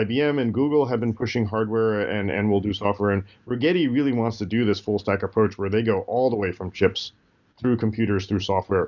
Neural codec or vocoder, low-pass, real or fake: none; 7.2 kHz; real